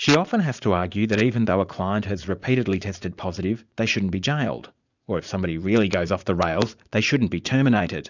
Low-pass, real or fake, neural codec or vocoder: 7.2 kHz; fake; vocoder, 44.1 kHz, 80 mel bands, Vocos